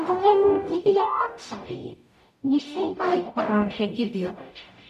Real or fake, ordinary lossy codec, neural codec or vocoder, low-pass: fake; none; codec, 44.1 kHz, 0.9 kbps, DAC; 14.4 kHz